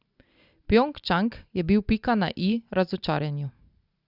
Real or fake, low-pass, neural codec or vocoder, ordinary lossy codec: real; 5.4 kHz; none; Opus, 64 kbps